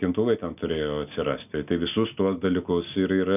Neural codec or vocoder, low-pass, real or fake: none; 3.6 kHz; real